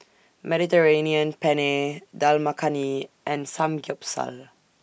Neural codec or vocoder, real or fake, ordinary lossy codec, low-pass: none; real; none; none